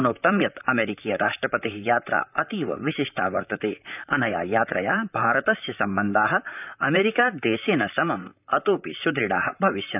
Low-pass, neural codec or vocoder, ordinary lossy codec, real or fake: 3.6 kHz; vocoder, 44.1 kHz, 128 mel bands, Pupu-Vocoder; none; fake